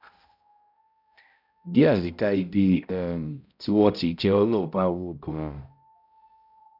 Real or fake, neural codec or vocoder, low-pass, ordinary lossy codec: fake; codec, 16 kHz, 0.5 kbps, X-Codec, HuBERT features, trained on balanced general audio; 5.4 kHz; none